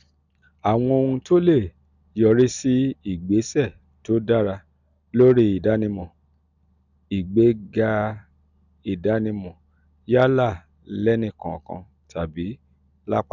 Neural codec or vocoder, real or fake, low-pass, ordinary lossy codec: none; real; 7.2 kHz; none